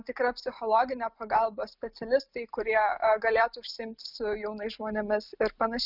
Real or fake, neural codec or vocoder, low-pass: real; none; 5.4 kHz